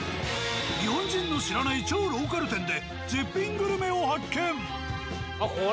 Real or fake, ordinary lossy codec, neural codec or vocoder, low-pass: real; none; none; none